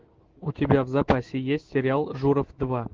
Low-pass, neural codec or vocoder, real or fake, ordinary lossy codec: 7.2 kHz; autoencoder, 48 kHz, 128 numbers a frame, DAC-VAE, trained on Japanese speech; fake; Opus, 16 kbps